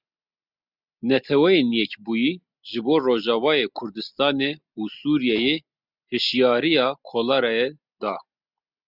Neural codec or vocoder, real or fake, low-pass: none; real; 5.4 kHz